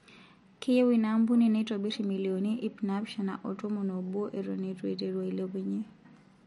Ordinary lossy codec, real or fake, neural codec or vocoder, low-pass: MP3, 48 kbps; real; none; 19.8 kHz